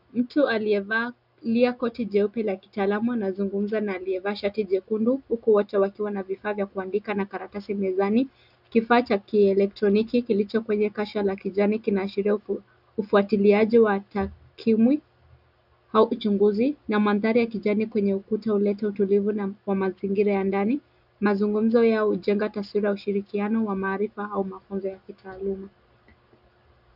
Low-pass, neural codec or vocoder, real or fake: 5.4 kHz; none; real